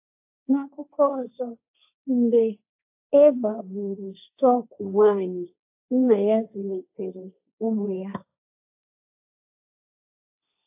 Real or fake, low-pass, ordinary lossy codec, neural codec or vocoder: fake; 3.6 kHz; AAC, 32 kbps; codec, 16 kHz, 1.1 kbps, Voila-Tokenizer